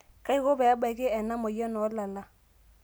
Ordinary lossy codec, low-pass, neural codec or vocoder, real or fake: none; none; none; real